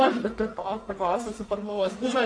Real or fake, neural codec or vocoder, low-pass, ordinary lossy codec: fake; codec, 44.1 kHz, 1.7 kbps, Pupu-Codec; 9.9 kHz; AAC, 48 kbps